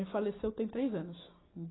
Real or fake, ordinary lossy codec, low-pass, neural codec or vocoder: real; AAC, 16 kbps; 7.2 kHz; none